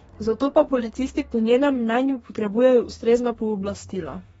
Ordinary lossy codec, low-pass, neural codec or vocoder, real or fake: AAC, 24 kbps; 14.4 kHz; codec, 32 kHz, 1.9 kbps, SNAC; fake